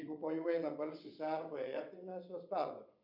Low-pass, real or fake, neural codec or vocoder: 5.4 kHz; fake; vocoder, 22.05 kHz, 80 mel bands, WaveNeXt